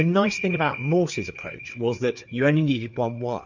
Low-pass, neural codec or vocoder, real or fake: 7.2 kHz; codec, 16 kHz, 4 kbps, FreqCodec, larger model; fake